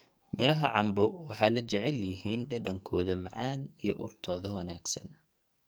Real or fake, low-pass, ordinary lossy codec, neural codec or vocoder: fake; none; none; codec, 44.1 kHz, 2.6 kbps, SNAC